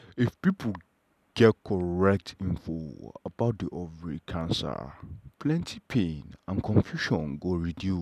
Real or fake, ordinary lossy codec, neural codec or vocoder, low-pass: real; none; none; 14.4 kHz